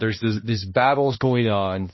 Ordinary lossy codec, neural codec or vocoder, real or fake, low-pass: MP3, 24 kbps; codec, 16 kHz, 1 kbps, X-Codec, HuBERT features, trained on balanced general audio; fake; 7.2 kHz